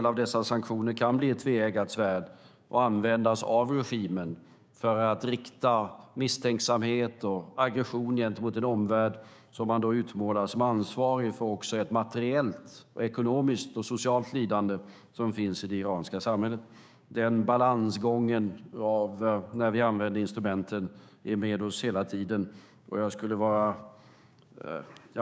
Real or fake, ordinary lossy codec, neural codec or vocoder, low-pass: fake; none; codec, 16 kHz, 6 kbps, DAC; none